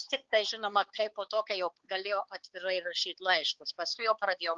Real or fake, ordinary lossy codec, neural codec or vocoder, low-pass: fake; Opus, 16 kbps; codec, 16 kHz, 4 kbps, X-Codec, HuBERT features, trained on balanced general audio; 7.2 kHz